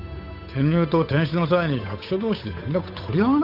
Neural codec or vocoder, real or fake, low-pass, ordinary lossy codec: codec, 16 kHz, 8 kbps, FunCodec, trained on Chinese and English, 25 frames a second; fake; 5.4 kHz; none